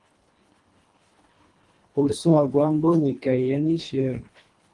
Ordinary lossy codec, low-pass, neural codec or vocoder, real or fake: Opus, 24 kbps; 10.8 kHz; codec, 24 kHz, 1.5 kbps, HILCodec; fake